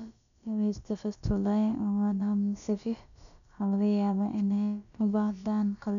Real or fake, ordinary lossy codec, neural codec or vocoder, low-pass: fake; none; codec, 16 kHz, about 1 kbps, DyCAST, with the encoder's durations; 7.2 kHz